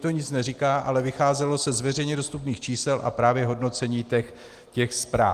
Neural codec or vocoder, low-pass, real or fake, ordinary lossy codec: none; 14.4 kHz; real; Opus, 24 kbps